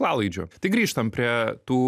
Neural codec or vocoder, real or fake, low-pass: none; real; 14.4 kHz